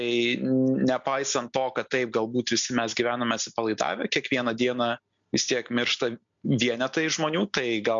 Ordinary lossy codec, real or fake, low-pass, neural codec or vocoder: MP3, 64 kbps; real; 7.2 kHz; none